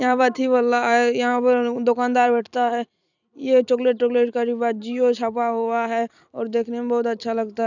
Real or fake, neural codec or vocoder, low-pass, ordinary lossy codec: fake; vocoder, 44.1 kHz, 128 mel bands every 256 samples, BigVGAN v2; 7.2 kHz; none